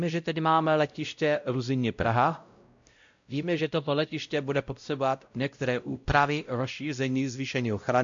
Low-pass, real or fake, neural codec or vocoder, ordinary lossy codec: 7.2 kHz; fake; codec, 16 kHz, 0.5 kbps, X-Codec, WavLM features, trained on Multilingual LibriSpeech; AAC, 64 kbps